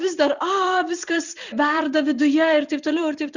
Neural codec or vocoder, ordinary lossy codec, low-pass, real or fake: vocoder, 44.1 kHz, 128 mel bands every 256 samples, BigVGAN v2; Opus, 64 kbps; 7.2 kHz; fake